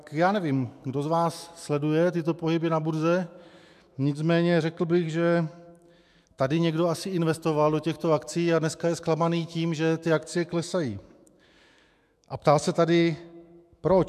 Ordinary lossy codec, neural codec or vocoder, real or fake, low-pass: MP3, 96 kbps; autoencoder, 48 kHz, 128 numbers a frame, DAC-VAE, trained on Japanese speech; fake; 14.4 kHz